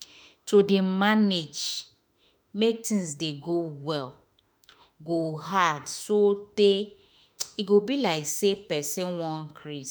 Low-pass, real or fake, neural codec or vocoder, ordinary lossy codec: none; fake; autoencoder, 48 kHz, 32 numbers a frame, DAC-VAE, trained on Japanese speech; none